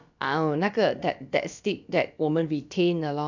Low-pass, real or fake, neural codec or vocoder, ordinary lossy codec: 7.2 kHz; fake; codec, 16 kHz, about 1 kbps, DyCAST, with the encoder's durations; none